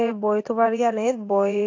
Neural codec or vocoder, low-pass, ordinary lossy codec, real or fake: vocoder, 22.05 kHz, 80 mel bands, WaveNeXt; 7.2 kHz; AAC, 48 kbps; fake